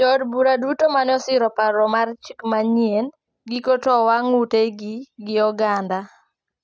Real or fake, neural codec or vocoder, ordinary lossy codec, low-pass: real; none; none; none